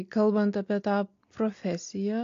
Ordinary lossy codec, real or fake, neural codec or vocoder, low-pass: AAC, 48 kbps; real; none; 7.2 kHz